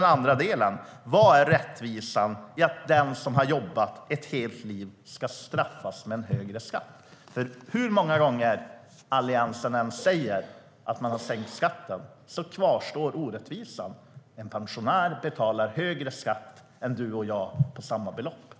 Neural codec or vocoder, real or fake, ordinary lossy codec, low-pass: none; real; none; none